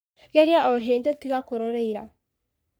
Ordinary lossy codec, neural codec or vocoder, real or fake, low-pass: none; codec, 44.1 kHz, 3.4 kbps, Pupu-Codec; fake; none